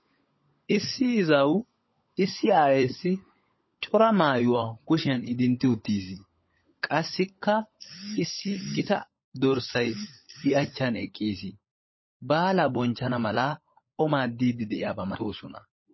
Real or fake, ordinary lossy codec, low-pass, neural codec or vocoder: fake; MP3, 24 kbps; 7.2 kHz; codec, 16 kHz, 16 kbps, FunCodec, trained on LibriTTS, 50 frames a second